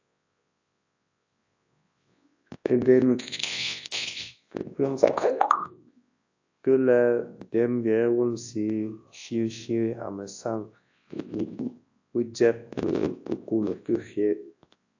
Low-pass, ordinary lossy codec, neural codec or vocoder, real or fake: 7.2 kHz; AAC, 48 kbps; codec, 24 kHz, 0.9 kbps, WavTokenizer, large speech release; fake